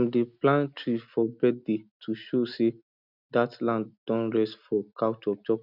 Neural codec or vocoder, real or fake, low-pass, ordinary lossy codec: none; real; 5.4 kHz; none